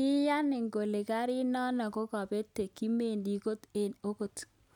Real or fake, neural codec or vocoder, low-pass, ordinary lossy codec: real; none; 19.8 kHz; none